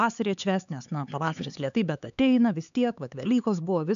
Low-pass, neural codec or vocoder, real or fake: 7.2 kHz; codec, 16 kHz, 4 kbps, X-Codec, HuBERT features, trained on LibriSpeech; fake